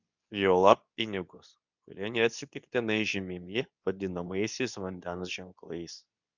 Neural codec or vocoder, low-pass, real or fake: codec, 24 kHz, 0.9 kbps, WavTokenizer, medium speech release version 2; 7.2 kHz; fake